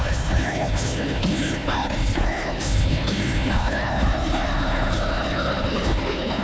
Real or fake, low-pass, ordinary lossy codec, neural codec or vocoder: fake; none; none; codec, 16 kHz, 1 kbps, FunCodec, trained on Chinese and English, 50 frames a second